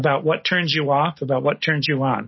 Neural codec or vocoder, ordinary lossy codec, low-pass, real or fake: none; MP3, 24 kbps; 7.2 kHz; real